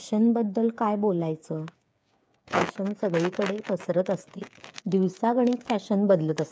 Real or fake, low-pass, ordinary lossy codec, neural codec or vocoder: fake; none; none; codec, 16 kHz, 8 kbps, FreqCodec, smaller model